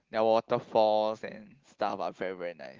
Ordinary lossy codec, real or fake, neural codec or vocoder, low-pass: Opus, 16 kbps; real; none; 7.2 kHz